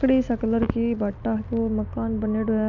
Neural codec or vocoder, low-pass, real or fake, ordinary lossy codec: none; 7.2 kHz; real; none